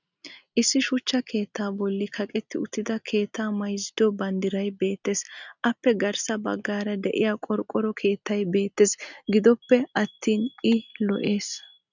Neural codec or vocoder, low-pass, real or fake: none; 7.2 kHz; real